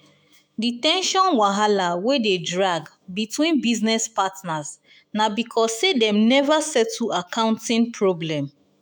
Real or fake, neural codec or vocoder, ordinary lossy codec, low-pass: fake; autoencoder, 48 kHz, 128 numbers a frame, DAC-VAE, trained on Japanese speech; none; none